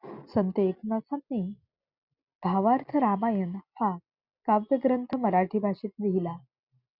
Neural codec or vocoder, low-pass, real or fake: none; 5.4 kHz; real